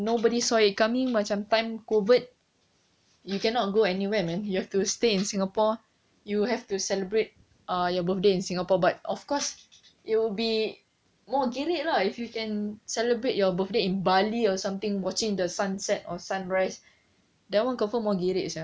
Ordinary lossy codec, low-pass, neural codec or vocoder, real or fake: none; none; none; real